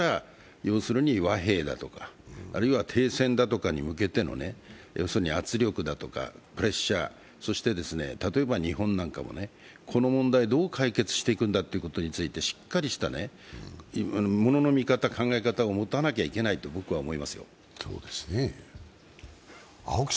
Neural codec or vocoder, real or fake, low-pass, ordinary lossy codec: none; real; none; none